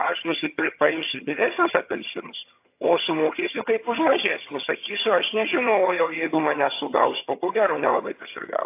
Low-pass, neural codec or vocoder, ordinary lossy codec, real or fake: 3.6 kHz; vocoder, 22.05 kHz, 80 mel bands, HiFi-GAN; AAC, 24 kbps; fake